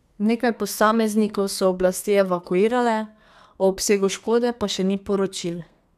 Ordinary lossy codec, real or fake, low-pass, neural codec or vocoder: none; fake; 14.4 kHz; codec, 32 kHz, 1.9 kbps, SNAC